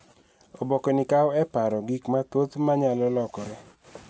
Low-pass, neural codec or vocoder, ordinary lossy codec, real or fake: none; none; none; real